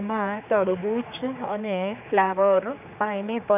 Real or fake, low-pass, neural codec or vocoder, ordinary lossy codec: fake; 3.6 kHz; codec, 16 kHz, 4 kbps, X-Codec, HuBERT features, trained on general audio; none